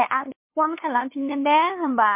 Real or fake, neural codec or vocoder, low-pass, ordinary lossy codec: fake; codec, 16 kHz, 2 kbps, FunCodec, trained on LibriTTS, 25 frames a second; 3.6 kHz; MP3, 24 kbps